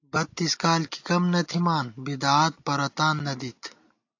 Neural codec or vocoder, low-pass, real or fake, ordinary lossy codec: none; 7.2 kHz; real; AAC, 48 kbps